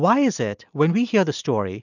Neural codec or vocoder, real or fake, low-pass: none; real; 7.2 kHz